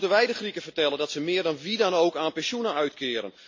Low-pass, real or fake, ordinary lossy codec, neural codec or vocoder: 7.2 kHz; real; MP3, 48 kbps; none